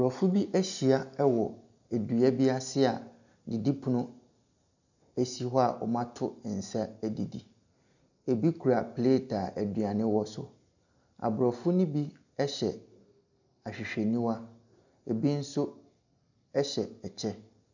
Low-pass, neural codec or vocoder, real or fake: 7.2 kHz; none; real